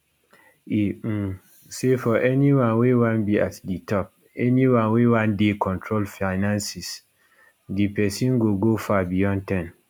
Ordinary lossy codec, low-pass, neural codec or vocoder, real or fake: none; none; none; real